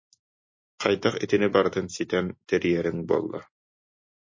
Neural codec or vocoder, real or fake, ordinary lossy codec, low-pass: none; real; MP3, 32 kbps; 7.2 kHz